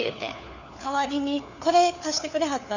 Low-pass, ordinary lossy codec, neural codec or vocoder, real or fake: 7.2 kHz; none; codec, 16 kHz, 4 kbps, FunCodec, trained on LibriTTS, 50 frames a second; fake